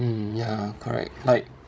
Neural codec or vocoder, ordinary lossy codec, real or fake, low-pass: codec, 16 kHz, 16 kbps, FreqCodec, larger model; none; fake; none